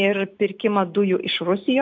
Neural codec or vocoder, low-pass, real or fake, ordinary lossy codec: none; 7.2 kHz; real; MP3, 64 kbps